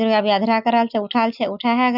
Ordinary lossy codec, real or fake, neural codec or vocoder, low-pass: none; real; none; 5.4 kHz